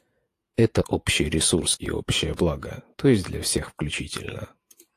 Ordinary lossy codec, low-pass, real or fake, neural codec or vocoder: AAC, 64 kbps; 14.4 kHz; fake; vocoder, 48 kHz, 128 mel bands, Vocos